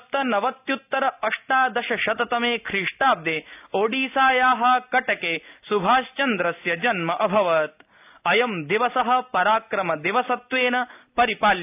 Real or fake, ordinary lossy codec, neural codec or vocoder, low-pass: real; AAC, 32 kbps; none; 3.6 kHz